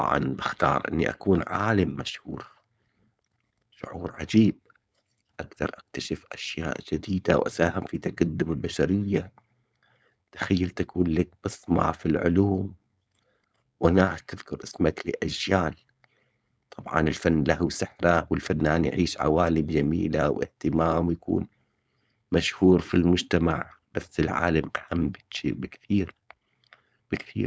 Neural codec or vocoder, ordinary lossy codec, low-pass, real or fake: codec, 16 kHz, 4.8 kbps, FACodec; none; none; fake